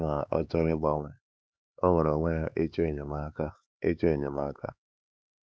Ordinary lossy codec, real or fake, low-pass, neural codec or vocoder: none; fake; none; codec, 16 kHz, 4 kbps, X-Codec, HuBERT features, trained on LibriSpeech